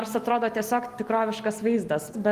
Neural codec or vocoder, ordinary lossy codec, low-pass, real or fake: none; Opus, 24 kbps; 14.4 kHz; real